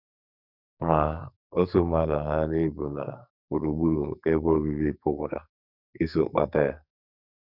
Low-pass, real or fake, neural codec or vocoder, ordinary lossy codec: 5.4 kHz; fake; codec, 44.1 kHz, 2.6 kbps, SNAC; none